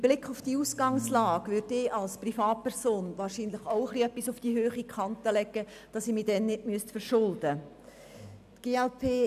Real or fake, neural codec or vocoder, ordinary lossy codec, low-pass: real; none; none; 14.4 kHz